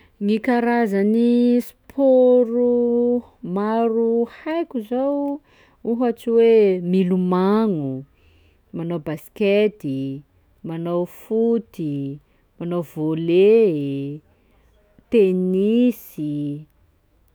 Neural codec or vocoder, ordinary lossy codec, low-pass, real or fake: autoencoder, 48 kHz, 128 numbers a frame, DAC-VAE, trained on Japanese speech; none; none; fake